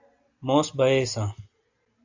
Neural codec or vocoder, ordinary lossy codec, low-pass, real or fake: none; AAC, 48 kbps; 7.2 kHz; real